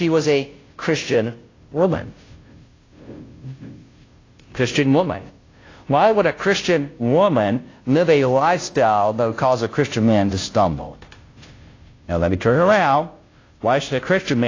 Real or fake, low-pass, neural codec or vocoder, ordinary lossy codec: fake; 7.2 kHz; codec, 16 kHz, 0.5 kbps, FunCodec, trained on Chinese and English, 25 frames a second; AAC, 32 kbps